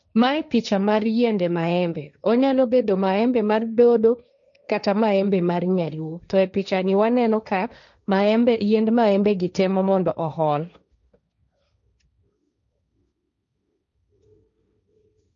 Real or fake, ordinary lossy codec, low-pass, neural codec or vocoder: fake; none; 7.2 kHz; codec, 16 kHz, 1.1 kbps, Voila-Tokenizer